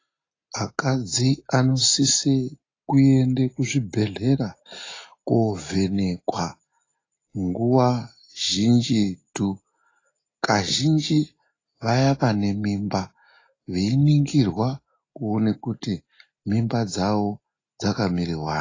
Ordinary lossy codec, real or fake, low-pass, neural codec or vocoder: AAC, 32 kbps; real; 7.2 kHz; none